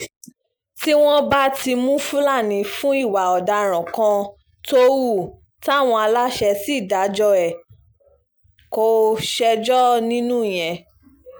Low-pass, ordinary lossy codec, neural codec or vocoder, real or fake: none; none; none; real